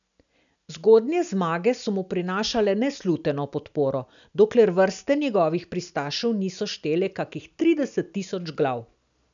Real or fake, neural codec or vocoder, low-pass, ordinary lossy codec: real; none; 7.2 kHz; none